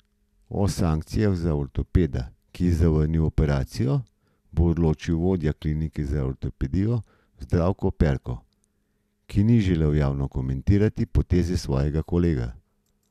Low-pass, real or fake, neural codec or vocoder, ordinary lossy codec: 14.4 kHz; real; none; none